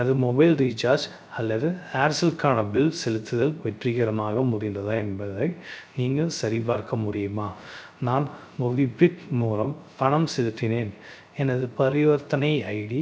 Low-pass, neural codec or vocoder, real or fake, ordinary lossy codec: none; codec, 16 kHz, 0.3 kbps, FocalCodec; fake; none